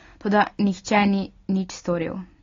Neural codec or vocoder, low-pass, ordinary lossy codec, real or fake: none; 7.2 kHz; AAC, 32 kbps; real